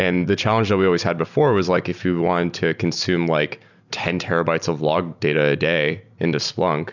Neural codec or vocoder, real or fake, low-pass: none; real; 7.2 kHz